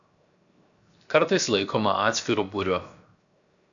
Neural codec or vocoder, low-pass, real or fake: codec, 16 kHz, 0.7 kbps, FocalCodec; 7.2 kHz; fake